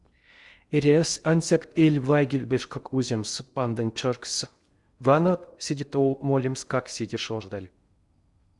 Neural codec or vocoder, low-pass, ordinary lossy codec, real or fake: codec, 16 kHz in and 24 kHz out, 0.6 kbps, FocalCodec, streaming, 2048 codes; 10.8 kHz; Opus, 64 kbps; fake